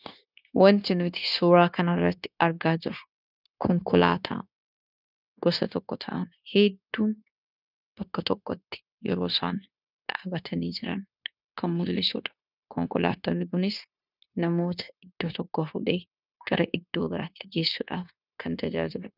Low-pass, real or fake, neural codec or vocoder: 5.4 kHz; fake; codec, 16 kHz, 0.9 kbps, LongCat-Audio-Codec